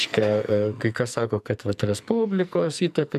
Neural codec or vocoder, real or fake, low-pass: codec, 44.1 kHz, 2.6 kbps, SNAC; fake; 14.4 kHz